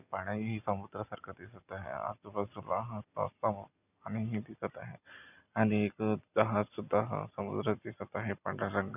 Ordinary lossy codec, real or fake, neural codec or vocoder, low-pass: none; real; none; 3.6 kHz